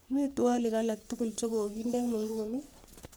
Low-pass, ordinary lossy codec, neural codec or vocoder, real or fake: none; none; codec, 44.1 kHz, 3.4 kbps, Pupu-Codec; fake